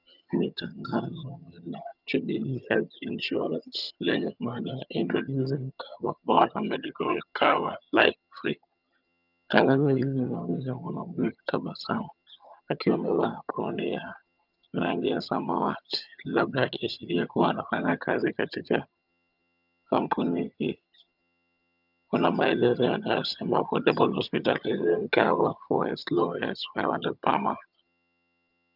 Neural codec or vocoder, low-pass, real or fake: vocoder, 22.05 kHz, 80 mel bands, HiFi-GAN; 5.4 kHz; fake